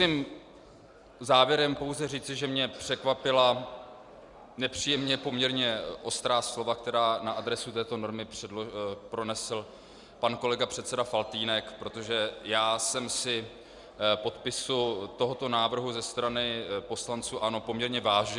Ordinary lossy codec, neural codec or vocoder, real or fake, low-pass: Opus, 64 kbps; none; real; 10.8 kHz